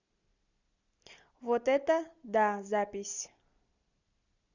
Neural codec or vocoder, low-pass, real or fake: none; 7.2 kHz; real